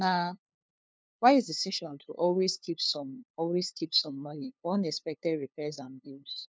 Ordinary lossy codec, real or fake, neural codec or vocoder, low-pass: none; fake; codec, 16 kHz, 2 kbps, FunCodec, trained on LibriTTS, 25 frames a second; none